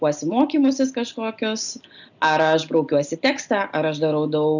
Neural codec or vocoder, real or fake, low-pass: none; real; 7.2 kHz